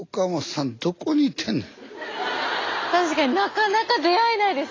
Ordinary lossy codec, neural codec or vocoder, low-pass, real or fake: AAC, 32 kbps; none; 7.2 kHz; real